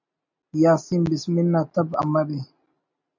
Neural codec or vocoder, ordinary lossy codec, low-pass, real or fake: none; MP3, 64 kbps; 7.2 kHz; real